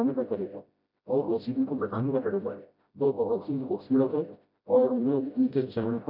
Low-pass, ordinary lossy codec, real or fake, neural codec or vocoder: 5.4 kHz; MP3, 48 kbps; fake; codec, 16 kHz, 0.5 kbps, FreqCodec, smaller model